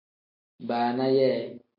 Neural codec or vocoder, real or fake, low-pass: none; real; 5.4 kHz